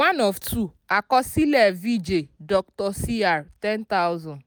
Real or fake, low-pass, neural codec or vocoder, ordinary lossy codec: real; none; none; none